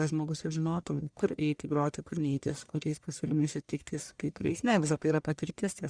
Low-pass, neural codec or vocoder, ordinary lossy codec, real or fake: 9.9 kHz; codec, 44.1 kHz, 1.7 kbps, Pupu-Codec; Opus, 64 kbps; fake